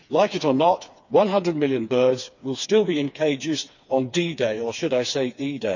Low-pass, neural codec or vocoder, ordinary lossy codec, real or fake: 7.2 kHz; codec, 16 kHz, 4 kbps, FreqCodec, smaller model; none; fake